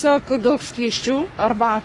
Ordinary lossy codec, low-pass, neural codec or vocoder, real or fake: AAC, 32 kbps; 10.8 kHz; codec, 44.1 kHz, 3.4 kbps, Pupu-Codec; fake